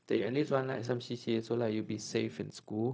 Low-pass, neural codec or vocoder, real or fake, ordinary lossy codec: none; codec, 16 kHz, 0.4 kbps, LongCat-Audio-Codec; fake; none